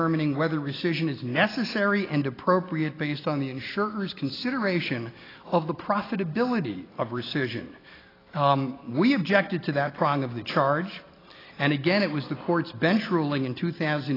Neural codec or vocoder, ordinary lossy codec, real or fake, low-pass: none; AAC, 24 kbps; real; 5.4 kHz